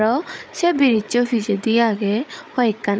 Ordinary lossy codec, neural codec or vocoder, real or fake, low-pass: none; codec, 16 kHz, 8 kbps, FreqCodec, larger model; fake; none